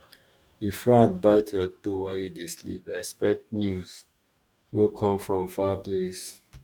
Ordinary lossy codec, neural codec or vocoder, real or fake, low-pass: none; codec, 44.1 kHz, 2.6 kbps, DAC; fake; 19.8 kHz